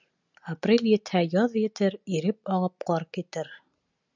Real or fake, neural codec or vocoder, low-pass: real; none; 7.2 kHz